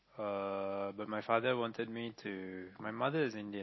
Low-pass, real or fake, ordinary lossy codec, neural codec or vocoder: 7.2 kHz; real; MP3, 24 kbps; none